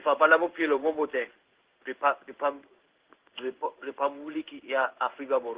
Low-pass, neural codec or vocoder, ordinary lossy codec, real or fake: 3.6 kHz; codec, 16 kHz in and 24 kHz out, 1 kbps, XY-Tokenizer; Opus, 16 kbps; fake